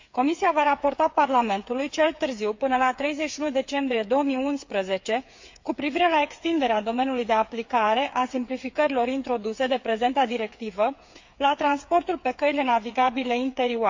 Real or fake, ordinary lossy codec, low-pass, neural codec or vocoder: fake; MP3, 48 kbps; 7.2 kHz; codec, 16 kHz, 8 kbps, FreqCodec, smaller model